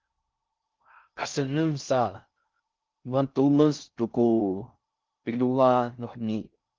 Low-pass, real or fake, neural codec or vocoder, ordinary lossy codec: 7.2 kHz; fake; codec, 16 kHz in and 24 kHz out, 0.6 kbps, FocalCodec, streaming, 4096 codes; Opus, 24 kbps